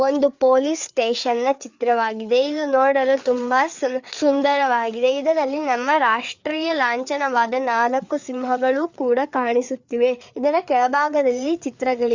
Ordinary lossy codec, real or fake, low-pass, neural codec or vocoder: Opus, 64 kbps; fake; 7.2 kHz; codec, 16 kHz, 4 kbps, FreqCodec, larger model